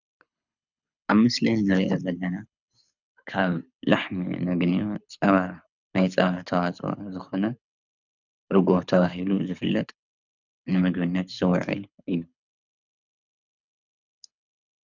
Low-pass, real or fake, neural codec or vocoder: 7.2 kHz; fake; codec, 24 kHz, 6 kbps, HILCodec